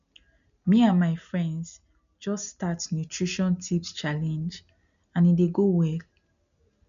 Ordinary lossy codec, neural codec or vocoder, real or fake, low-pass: none; none; real; 7.2 kHz